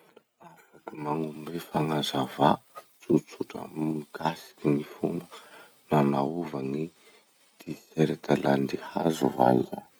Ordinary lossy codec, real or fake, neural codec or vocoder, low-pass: none; real; none; none